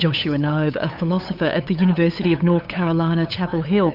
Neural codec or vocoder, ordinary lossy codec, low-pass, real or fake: codec, 16 kHz, 8 kbps, FunCodec, trained on LibriTTS, 25 frames a second; AAC, 48 kbps; 5.4 kHz; fake